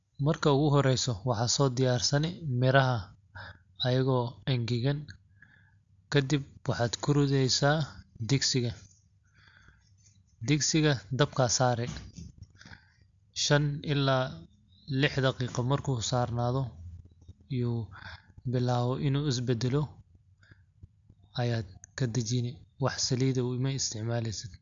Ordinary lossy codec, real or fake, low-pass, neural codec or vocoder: AAC, 64 kbps; real; 7.2 kHz; none